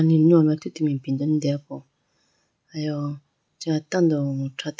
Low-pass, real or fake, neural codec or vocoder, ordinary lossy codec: none; real; none; none